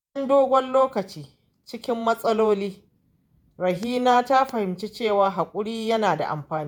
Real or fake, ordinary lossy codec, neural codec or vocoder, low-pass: fake; none; vocoder, 48 kHz, 128 mel bands, Vocos; none